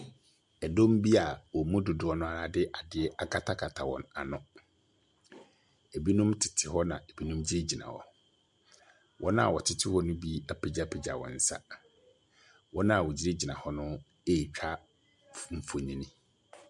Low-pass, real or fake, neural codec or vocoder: 10.8 kHz; real; none